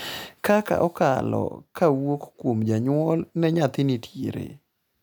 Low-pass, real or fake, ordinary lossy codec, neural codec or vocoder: none; real; none; none